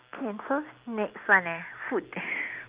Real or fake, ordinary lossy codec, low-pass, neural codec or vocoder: real; Opus, 32 kbps; 3.6 kHz; none